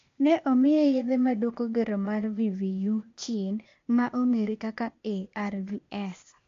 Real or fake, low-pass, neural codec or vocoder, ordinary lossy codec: fake; 7.2 kHz; codec, 16 kHz, 0.8 kbps, ZipCodec; MP3, 48 kbps